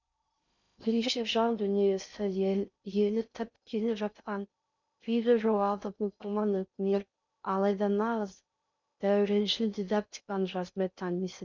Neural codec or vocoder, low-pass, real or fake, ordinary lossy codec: codec, 16 kHz in and 24 kHz out, 0.6 kbps, FocalCodec, streaming, 2048 codes; 7.2 kHz; fake; none